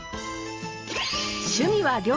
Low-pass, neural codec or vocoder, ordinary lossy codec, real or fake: 7.2 kHz; none; Opus, 24 kbps; real